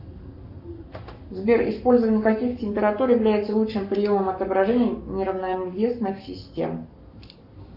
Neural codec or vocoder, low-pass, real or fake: codec, 44.1 kHz, 7.8 kbps, Pupu-Codec; 5.4 kHz; fake